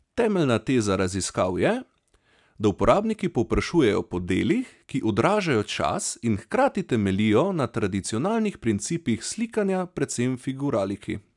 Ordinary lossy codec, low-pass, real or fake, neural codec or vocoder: none; 10.8 kHz; real; none